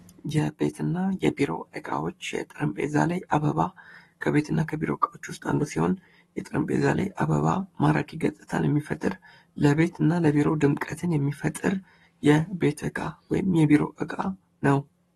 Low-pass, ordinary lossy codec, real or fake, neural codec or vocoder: 19.8 kHz; AAC, 32 kbps; fake; codec, 44.1 kHz, 7.8 kbps, Pupu-Codec